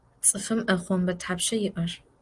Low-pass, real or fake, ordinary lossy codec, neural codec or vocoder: 10.8 kHz; real; Opus, 24 kbps; none